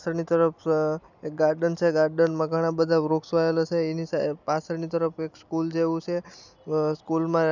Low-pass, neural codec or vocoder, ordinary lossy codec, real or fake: 7.2 kHz; none; none; real